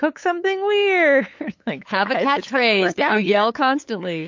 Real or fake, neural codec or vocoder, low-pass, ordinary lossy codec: fake; codec, 16 kHz, 8 kbps, FunCodec, trained on LibriTTS, 25 frames a second; 7.2 kHz; MP3, 48 kbps